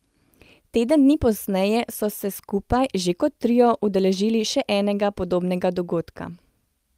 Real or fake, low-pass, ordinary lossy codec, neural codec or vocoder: real; 14.4 kHz; Opus, 32 kbps; none